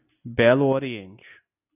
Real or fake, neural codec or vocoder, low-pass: real; none; 3.6 kHz